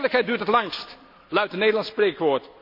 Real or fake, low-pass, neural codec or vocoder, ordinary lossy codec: real; 5.4 kHz; none; none